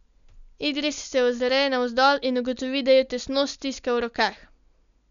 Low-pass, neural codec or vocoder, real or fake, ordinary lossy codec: 7.2 kHz; none; real; none